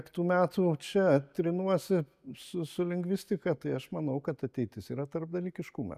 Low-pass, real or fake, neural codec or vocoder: 14.4 kHz; real; none